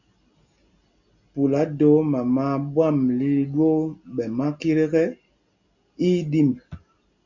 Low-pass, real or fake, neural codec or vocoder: 7.2 kHz; real; none